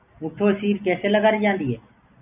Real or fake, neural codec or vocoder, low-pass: real; none; 3.6 kHz